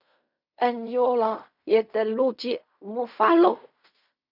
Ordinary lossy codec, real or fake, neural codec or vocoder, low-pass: none; fake; codec, 16 kHz in and 24 kHz out, 0.4 kbps, LongCat-Audio-Codec, fine tuned four codebook decoder; 5.4 kHz